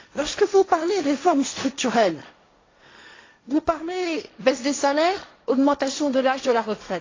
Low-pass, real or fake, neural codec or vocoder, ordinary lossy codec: 7.2 kHz; fake; codec, 16 kHz, 1.1 kbps, Voila-Tokenizer; AAC, 32 kbps